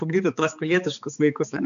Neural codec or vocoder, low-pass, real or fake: codec, 16 kHz, 4 kbps, X-Codec, HuBERT features, trained on balanced general audio; 7.2 kHz; fake